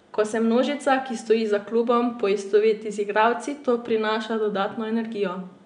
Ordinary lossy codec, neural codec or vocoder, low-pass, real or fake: none; none; 9.9 kHz; real